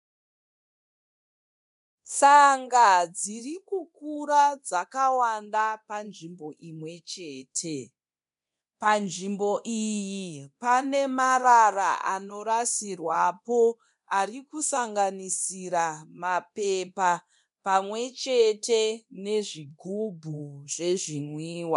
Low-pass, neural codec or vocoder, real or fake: 10.8 kHz; codec, 24 kHz, 0.9 kbps, DualCodec; fake